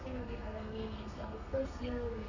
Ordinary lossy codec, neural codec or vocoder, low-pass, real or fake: none; codec, 32 kHz, 1.9 kbps, SNAC; 7.2 kHz; fake